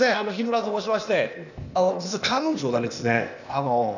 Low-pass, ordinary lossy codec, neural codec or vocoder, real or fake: 7.2 kHz; none; codec, 16 kHz, 0.8 kbps, ZipCodec; fake